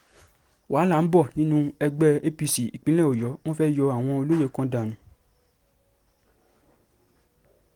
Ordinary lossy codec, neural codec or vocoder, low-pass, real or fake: Opus, 24 kbps; none; 19.8 kHz; real